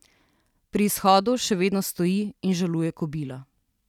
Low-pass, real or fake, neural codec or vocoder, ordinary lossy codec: 19.8 kHz; real; none; none